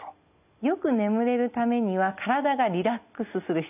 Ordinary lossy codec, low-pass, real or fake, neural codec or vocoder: none; 3.6 kHz; real; none